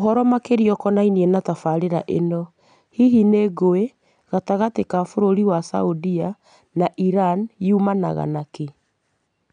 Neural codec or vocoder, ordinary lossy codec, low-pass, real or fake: none; none; 9.9 kHz; real